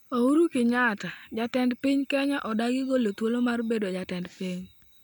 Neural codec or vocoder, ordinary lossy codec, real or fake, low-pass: none; none; real; none